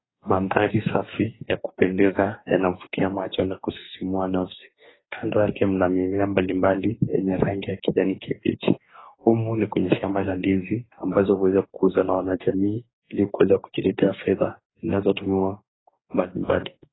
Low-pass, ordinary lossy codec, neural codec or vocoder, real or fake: 7.2 kHz; AAC, 16 kbps; codec, 44.1 kHz, 2.6 kbps, DAC; fake